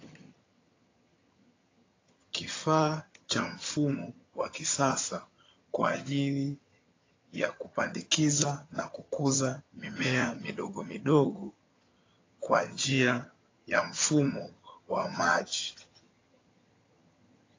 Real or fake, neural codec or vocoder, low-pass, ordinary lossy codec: fake; vocoder, 22.05 kHz, 80 mel bands, HiFi-GAN; 7.2 kHz; AAC, 32 kbps